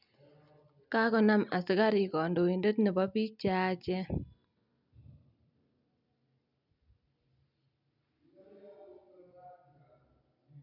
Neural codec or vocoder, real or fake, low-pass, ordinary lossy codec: none; real; 5.4 kHz; none